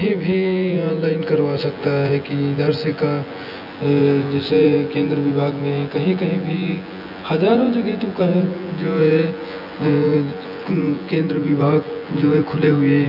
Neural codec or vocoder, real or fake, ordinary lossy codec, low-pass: vocoder, 24 kHz, 100 mel bands, Vocos; fake; none; 5.4 kHz